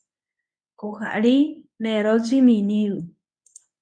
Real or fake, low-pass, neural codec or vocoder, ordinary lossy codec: fake; 9.9 kHz; codec, 24 kHz, 0.9 kbps, WavTokenizer, medium speech release version 1; MP3, 48 kbps